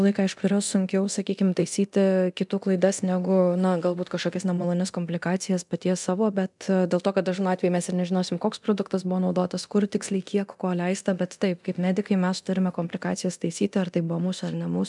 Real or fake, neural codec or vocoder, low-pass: fake; codec, 24 kHz, 0.9 kbps, DualCodec; 10.8 kHz